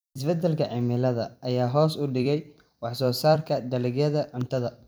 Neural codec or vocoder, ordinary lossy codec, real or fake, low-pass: vocoder, 44.1 kHz, 128 mel bands every 256 samples, BigVGAN v2; none; fake; none